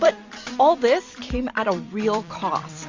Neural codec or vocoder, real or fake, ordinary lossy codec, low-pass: vocoder, 44.1 kHz, 128 mel bands every 256 samples, BigVGAN v2; fake; MP3, 48 kbps; 7.2 kHz